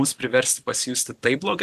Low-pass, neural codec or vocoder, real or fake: 14.4 kHz; none; real